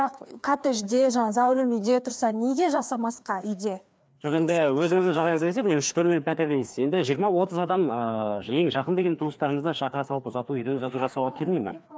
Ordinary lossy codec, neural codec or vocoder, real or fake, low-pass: none; codec, 16 kHz, 2 kbps, FreqCodec, larger model; fake; none